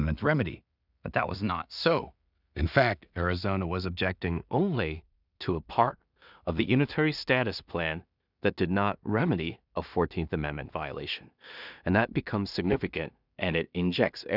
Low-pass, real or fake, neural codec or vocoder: 5.4 kHz; fake; codec, 16 kHz in and 24 kHz out, 0.4 kbps, LongCat-Audio-Codec, two codebook decoder